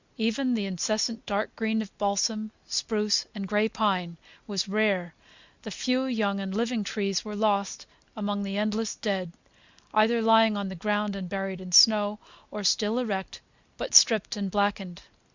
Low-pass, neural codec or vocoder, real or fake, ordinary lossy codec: 7.2 kHz; none; real; Opus, 64 kbps